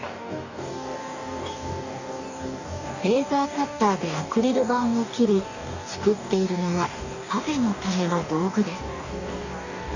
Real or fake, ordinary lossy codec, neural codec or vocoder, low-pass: fake; none; codec, 44.1 kHz, 2.6 kbps, DAC; 7.2 kHz